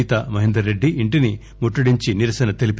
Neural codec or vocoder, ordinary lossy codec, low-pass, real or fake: none; none; none; real